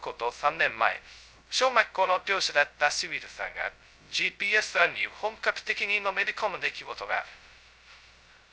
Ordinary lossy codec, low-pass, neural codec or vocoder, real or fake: none; none; codec, 16 kHz, 0.2 kbps, FocalCodec; fake